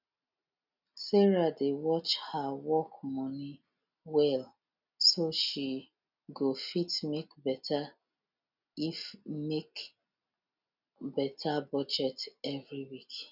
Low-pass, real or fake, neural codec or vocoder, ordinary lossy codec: 5.4 kHz; real; none; none